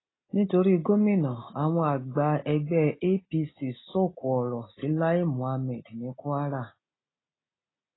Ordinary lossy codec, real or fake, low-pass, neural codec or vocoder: AAC, 16 kbps; real; 7.2 kHz; none